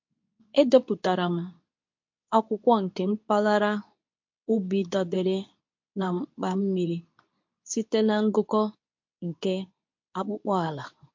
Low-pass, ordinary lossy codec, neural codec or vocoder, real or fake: 7.2 kHz; MP3, 48 kbps; codec, 24 kHz, 0.9 kbps, WavTokenizer, medium speech release version 2; fake